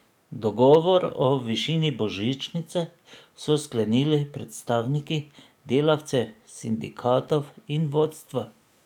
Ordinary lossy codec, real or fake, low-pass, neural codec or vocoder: none; fake; 19.8 kHz; codec, 44.1 kHz, 7.8 kbps, DAC